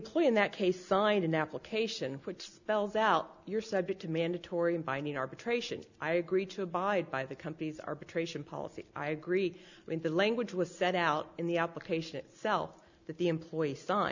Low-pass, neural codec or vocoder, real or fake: 7.2 kHz; none; real